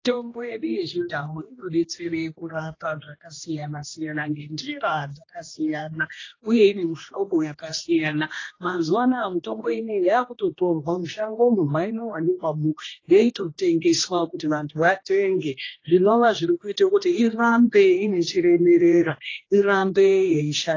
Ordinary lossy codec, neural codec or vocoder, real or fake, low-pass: AAC, 32 kbps; codec, 16 kHz, 1 kbps, X-Codec, HuBERT features, trained on general audio; fake; 7.2 kHz